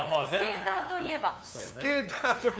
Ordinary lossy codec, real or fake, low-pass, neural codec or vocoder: none; fake; none; codec, 16 kHz, 2 kbps, FunCodec, trained on LibriTTS, 25 frames a second